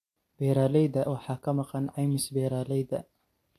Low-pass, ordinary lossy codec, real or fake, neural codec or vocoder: 14.4 kHz; AAC, 64 kbps; real; none